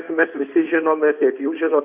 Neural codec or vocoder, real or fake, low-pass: codec, 16 kHz in and 24 kHz out, 2.2 kbps, FireRedTTS-2 codec; fake; 3.6 kHz